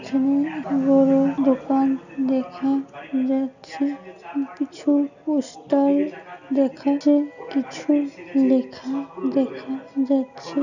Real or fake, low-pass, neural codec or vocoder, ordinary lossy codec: real; 7.2 kHz; none; none